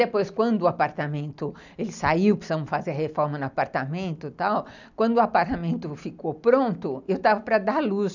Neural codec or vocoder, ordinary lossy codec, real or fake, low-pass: none; none; real; 7.2 kHz